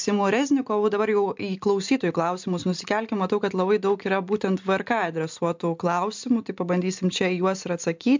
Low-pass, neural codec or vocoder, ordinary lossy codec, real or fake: 7.2 kHz; none; MP3, 64 kbps; real